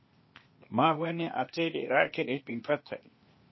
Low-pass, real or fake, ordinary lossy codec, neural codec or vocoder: 7.2 kHz; fake; MP3, 24 kbps; codec, 16 kHz, 0.8 kbps, ZipCodec